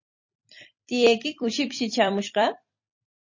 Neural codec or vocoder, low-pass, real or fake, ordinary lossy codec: vocoder, 44.1 kHz, 128 mel bands every 256 samples, BigVGAN v2; 7.2 kHz; fake; MP3, 32 kbps